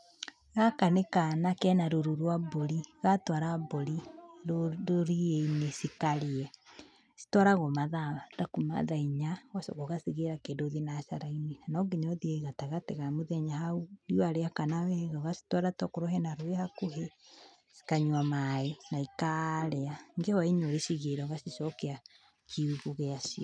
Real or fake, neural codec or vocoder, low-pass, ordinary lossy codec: real; none; 9.9 kHz; none